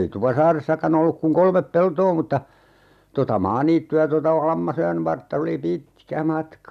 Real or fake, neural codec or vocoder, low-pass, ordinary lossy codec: real; none; 14.4 kHz; none